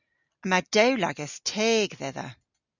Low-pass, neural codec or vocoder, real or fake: 7.2 kHz; none; real